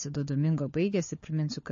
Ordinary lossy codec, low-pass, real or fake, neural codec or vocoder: MP3, 32 kbps; 7.2 kHz; fake; codec, 16 kHz, 4 kbps, FunCodec, trained on LibriTTS, 50 frames a second